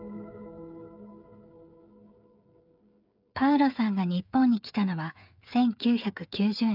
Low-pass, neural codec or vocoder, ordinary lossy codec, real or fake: 5.4 kHz; codec, 16 kHz, 8 kbps, FreqCodec, smaller model; none; fake